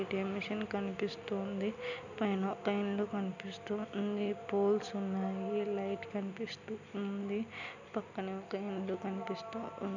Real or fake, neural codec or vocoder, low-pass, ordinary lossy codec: real; none; 7.2 kHz; none